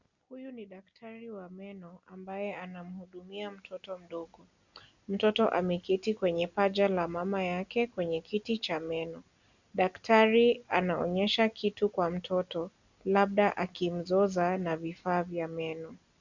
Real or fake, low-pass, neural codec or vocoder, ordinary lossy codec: real; 7.2 kHz; none; Opus, 64 kbps